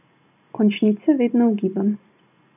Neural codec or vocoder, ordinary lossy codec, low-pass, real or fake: none; none; 3.6 kHz; real